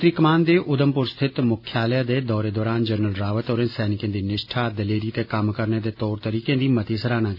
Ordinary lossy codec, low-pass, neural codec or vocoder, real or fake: none; 5.4 kHz; none; real